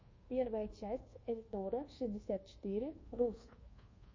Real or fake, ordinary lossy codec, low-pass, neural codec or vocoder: fake; MP3, 32 kbps; 7.2 kHz; codec, 24 kHz, 1.2 kbps, DualCodec